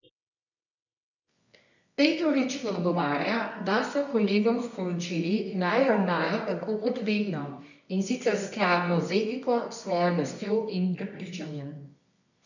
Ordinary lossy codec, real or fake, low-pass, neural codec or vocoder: none; fake; 7.2 kHz; codec, 24 kHz, 0.9 kbps, WavTokenizer, medium music audio release